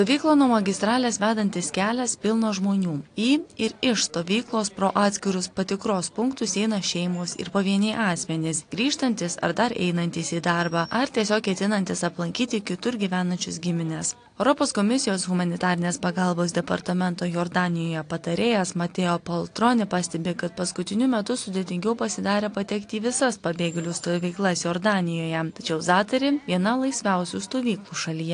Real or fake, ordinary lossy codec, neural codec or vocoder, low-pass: real; AAC, 48 kbps; none; 9.9 kHz